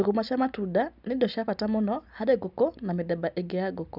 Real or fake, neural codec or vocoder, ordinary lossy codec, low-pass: real; none; none; 5.4 kHz